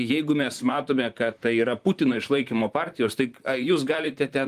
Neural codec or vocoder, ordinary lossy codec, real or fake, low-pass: vocoder, 44.1 kHz, 128 mel bands, Pupu-Vocoder; Opus, 32 kbps; fake; 14.4 kHz